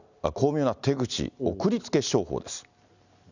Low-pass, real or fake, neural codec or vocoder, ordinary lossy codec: 7.2 kHz; real; none; none